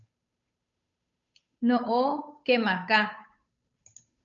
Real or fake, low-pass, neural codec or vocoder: fake; 7.2 kHz; codec, 16 kHz, 8 kbps, FunCodec, trained on Chinese and English, 25 frames a second